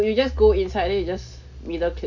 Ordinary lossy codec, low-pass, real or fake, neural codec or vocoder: none; 7.2 kHz; real; none